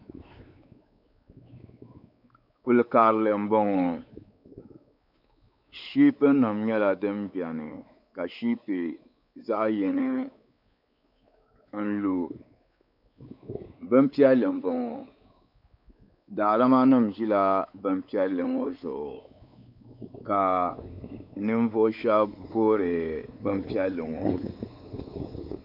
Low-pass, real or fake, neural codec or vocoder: 5.4 kHz; fake; codec, 16 kHz, 4 kbps, X-Codec, WavLM features, trained on Multilingual LibriSpeech